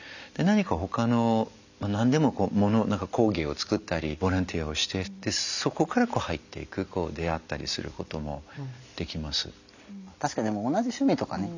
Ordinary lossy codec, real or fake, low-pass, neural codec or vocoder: none; real; 7.2 kHz; none